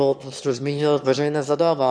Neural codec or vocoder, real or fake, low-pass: autoencoder, 22.05 kHz, a latent of 192 numbers a frame, VITS, trained on one speaker; fake; 9.9 kHz